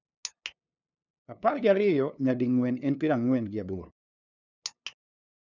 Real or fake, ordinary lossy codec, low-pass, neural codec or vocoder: fake; none; 7.2 kHz; codec, 16 kHz, 2 kbps, FunCodec, trained on LibriTTS, 25 frames a second